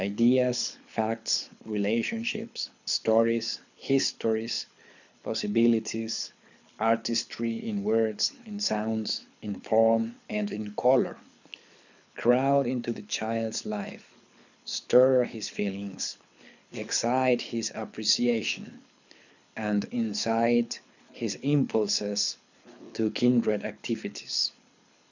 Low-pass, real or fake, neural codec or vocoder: 7.2 kHz; fake; codec, 24 kHz, 6 kbps, HILCodec